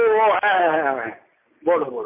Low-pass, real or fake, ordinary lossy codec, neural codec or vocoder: 3.6 kHz; real; MP3, 24 kbps; none